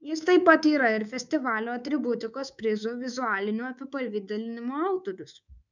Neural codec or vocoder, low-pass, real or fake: codec, 24 kHz, 3.1 kbps, DualCodec; 7.2 kHz; fake